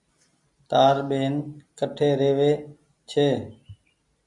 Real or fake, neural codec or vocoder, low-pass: real; none; 10.8 kHz